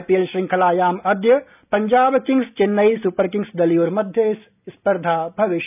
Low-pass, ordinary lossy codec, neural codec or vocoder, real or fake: 3.6 kHz; none; none; real